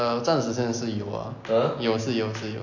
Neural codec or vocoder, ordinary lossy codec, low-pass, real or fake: none; AAC, 48 kbps; 7.2 kHz; real